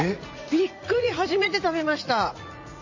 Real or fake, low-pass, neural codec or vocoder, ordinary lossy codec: fake; 7.2 kHz; vocoder, 44.1 kHz, 80 mel bands, Vocos; MP3, 32 kbps